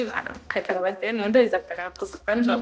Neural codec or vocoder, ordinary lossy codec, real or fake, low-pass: codec, 16 kHz, 1 kbps, X-Codec, HuBERT features, trained on balanced general audio; none; fake; none